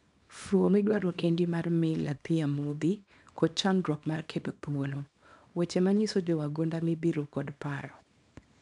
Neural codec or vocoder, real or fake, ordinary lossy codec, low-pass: codec, 24 kHz, 0.9 kbps, WavTokenizer, small release; fake; none; 10.8 kHz